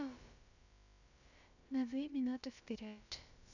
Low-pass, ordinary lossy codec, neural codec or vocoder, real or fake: 7.2 kHz; none; codec, 16 kHz, about 1 kbps, DyCAST, with the encoder's durations; fake